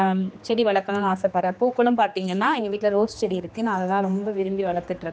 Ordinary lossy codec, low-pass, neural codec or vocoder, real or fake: none; none; codec, 16 kHz, 2 kbps, X-Codec, HuBERT features, trained on general audio; fake